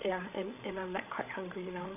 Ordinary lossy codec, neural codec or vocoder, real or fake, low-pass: none; codec, 16 kHz, 16 kbps, FreqCodec, larger model; fake; 3.6 kHz